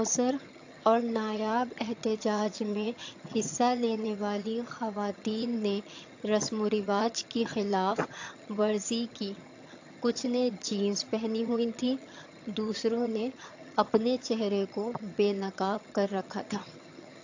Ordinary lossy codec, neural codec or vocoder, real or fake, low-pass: AAC, 48 kbps; vocoder, 22.05 kHz, 80 mel bands, HiFi-GAN; fake; 7.2 kHz